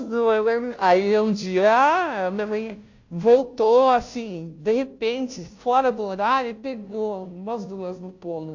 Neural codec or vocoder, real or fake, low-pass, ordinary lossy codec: codec, 16 kHz, 0.5 kbps, FunCodec, trained on Chinese and English, 25 frames a second; fake; 7.2 kHz; AAC, 48 kbps